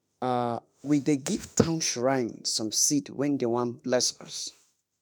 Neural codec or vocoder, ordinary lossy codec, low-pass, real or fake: autoencoder, 48 kHz, 32 numbers a frame, DAC-VAE, trained on Japanese speech; none; none; fake